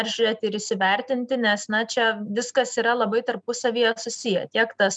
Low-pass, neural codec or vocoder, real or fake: 9.9 kHz; none; real